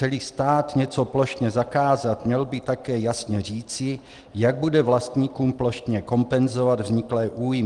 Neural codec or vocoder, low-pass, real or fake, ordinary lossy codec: none; 10.8 kHz; real; Opus, 24 kbps